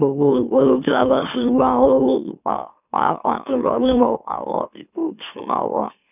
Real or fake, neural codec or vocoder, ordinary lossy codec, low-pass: fake; autoencoder, 44.1 kHz, a latent of 192 numbers a frame, MeloTTS; none; 3.6 kHz